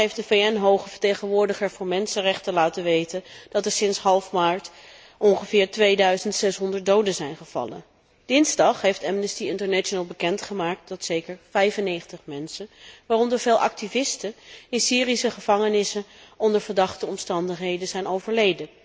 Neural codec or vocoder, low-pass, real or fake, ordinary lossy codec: none; none; real; none